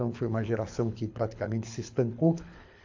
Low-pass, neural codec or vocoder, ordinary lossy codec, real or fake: 7.2 kHz; codec, 24 kHz, 6 kbps, HILCodec; AAC, 48 kbps; fake